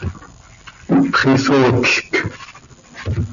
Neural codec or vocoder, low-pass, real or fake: none; 7.2 kHz; real